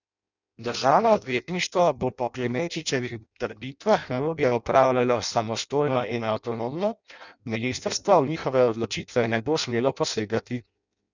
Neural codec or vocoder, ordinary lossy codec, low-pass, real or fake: codec, 16 kHz in and 24 kHz out, 0.6 kbps, FireRedTTS-2 codec; none; 7.2 kHz; fake